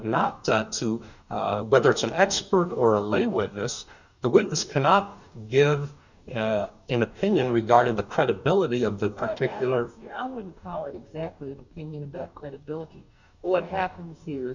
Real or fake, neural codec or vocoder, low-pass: fake; codec, 44.1 kHz, 2.6 kbps, DAC; 7.2 kHz